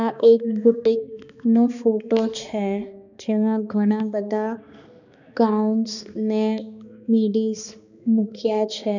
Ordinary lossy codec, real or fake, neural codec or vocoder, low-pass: none; fake; codec, 16 kHz, 2 kbps, X-Codec, HuBERT features, trained on balanced general audio; 7.2 kHz